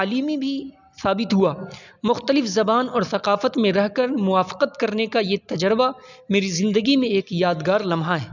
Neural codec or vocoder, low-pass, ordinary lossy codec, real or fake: none; 7.2 kHz; none; real